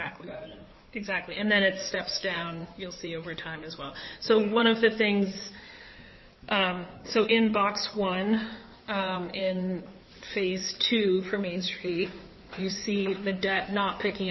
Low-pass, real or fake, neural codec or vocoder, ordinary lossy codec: 7.2 kHz; fake; codec, 16 kHz in and 24 kHz out, 2.2 kbps, FireRedTTS-2 codec; MP3, 24 kbps